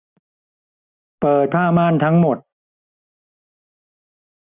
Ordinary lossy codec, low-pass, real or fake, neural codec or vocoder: none; 3.6 kHz; real; none